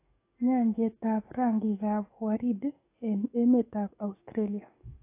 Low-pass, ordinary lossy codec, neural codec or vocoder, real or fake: 3.6 kHz; AAC, 24 kbps; codec, 44.1 kHz, 7.8 kbps, DAC; fake